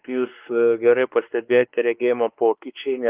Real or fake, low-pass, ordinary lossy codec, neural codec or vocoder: fake; 3.6 kHz; Opus, 32 kbps; codec, 16 kHz, 2 kbps, X-Codec, WavLM features, trained on Multilingual LibriSpeech